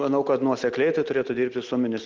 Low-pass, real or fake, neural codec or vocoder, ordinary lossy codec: 7.2 kHz; real; none; Opus, 16 kbps